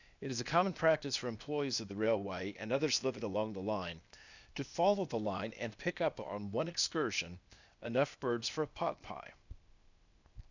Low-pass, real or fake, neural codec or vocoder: 7.2 kHz; fake; codec, 16 kHz, 0.8 kbps, ZipCodec